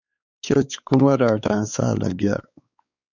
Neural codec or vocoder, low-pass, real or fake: codec, 16 kHz, 4 kbps, X-Codec, WavLM features, trained on Multilingual LibriSpeech; 7.2 kHz; fake